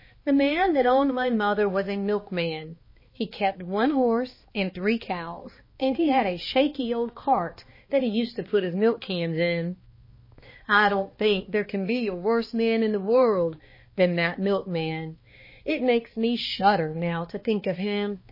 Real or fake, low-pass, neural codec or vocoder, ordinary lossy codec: fake; 5.4 kHz; codec, 16 kHz, 2 kbps, X-Codec, HuBERT features, trained on balanced general audio; MP3, 24 kbps